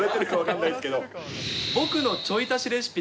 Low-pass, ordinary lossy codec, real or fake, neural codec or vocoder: none; none; real; none